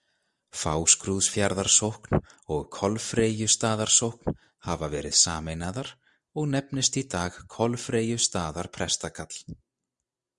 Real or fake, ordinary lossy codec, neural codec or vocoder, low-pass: real; Opus, 64 kbps; none; 10.8 kHz